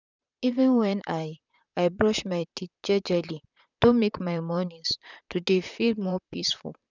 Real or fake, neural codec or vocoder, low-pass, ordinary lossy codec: fake; vocoder, 44.1 kHz, 128 mel bands, Pupu-Vocoder; 7.2 kHz; none